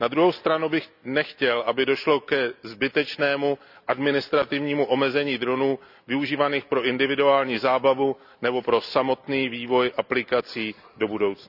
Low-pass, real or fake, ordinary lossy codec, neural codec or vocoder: 5.4 kHz; real; none; none